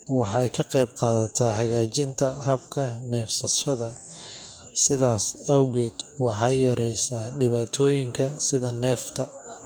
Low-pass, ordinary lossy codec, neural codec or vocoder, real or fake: none; none; codec, 44.1 kHz, 2.6 kbps, DAC; fake